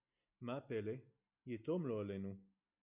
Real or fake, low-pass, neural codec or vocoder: real; 3.6 kHz; none